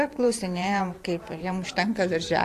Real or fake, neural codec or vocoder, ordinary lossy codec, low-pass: fake; vocoder, 44.1 kHz, 128 mel bands every 512 samples, BigVGAN v2; AAC, 64 kbps; 14.4 kHz